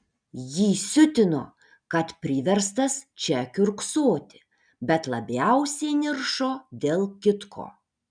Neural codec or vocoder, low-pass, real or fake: none; 9.9 kHz; real